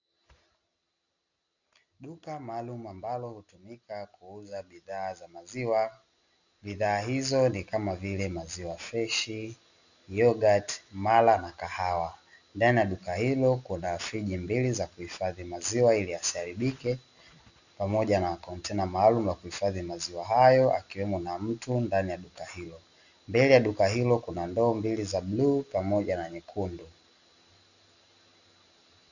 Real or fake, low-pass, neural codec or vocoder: real; 7.2 kHz; none